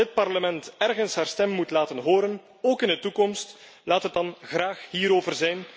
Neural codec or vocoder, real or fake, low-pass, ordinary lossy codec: none; real; none; none